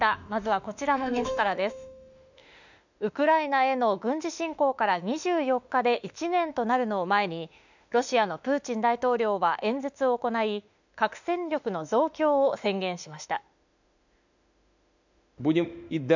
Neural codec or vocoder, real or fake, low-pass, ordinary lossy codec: autoencoder, 48 kHz, 32 numbers a frame, DAC-VAE, trained on Japanese speech; fake; 7.2 kHz; none